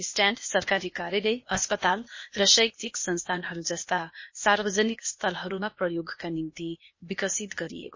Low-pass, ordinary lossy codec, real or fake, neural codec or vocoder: 7.2 kHz; MP3, 32 kbps; fake; codec, 16 kHz, 0.8 kbps, ZipCodec